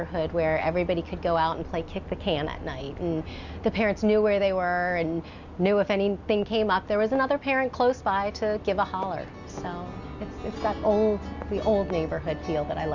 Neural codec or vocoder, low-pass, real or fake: none; 7.2 kHz; real